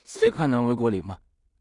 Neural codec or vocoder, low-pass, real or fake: codec, 16 kHz in and 24 kHz out, 0.4 kbps, LongCat-Audio-Codec, two codebook decoder; 10.8 kHz; fake